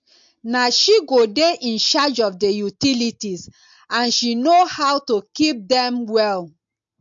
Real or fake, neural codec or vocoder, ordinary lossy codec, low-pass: real; none; MP3, 48 kbps; 7.2 kHz